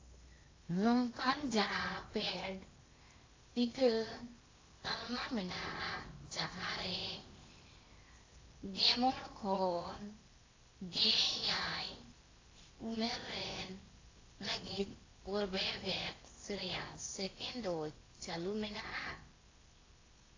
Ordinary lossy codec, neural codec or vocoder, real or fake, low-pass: AAC, 32 kbps; codec, 16 kHz in and 24 kHz out, 0.8 kbps, FocalCodec, streaming, 65536 codes; fake; 7.2 kHz